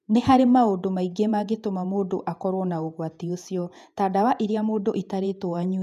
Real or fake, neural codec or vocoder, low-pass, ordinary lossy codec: fake; vocoder, 48 kHz, 128 mel bands, Vocos; 14.4 kHz; none